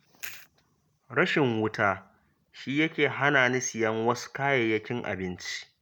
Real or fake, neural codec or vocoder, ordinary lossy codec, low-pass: real; none; none; none